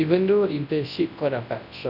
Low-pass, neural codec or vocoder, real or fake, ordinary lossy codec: 5.4 kHz; codec, 24 kHz, 0.9 kbps, WavTokenizer, large speech release; fake; none